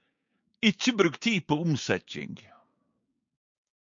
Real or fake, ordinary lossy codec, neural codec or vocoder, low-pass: fake; MP3, 48 kbps; codec, 16 kHz, 6 kbps, DAC; 7.2 kHz